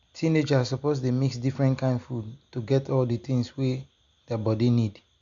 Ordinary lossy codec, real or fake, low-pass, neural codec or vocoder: MP3, 64 kbps; real; 7.2 kHz; none